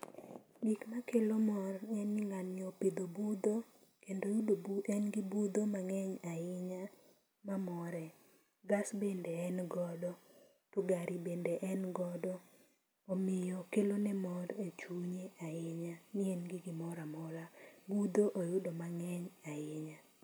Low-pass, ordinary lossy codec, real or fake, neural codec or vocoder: none; none; real; none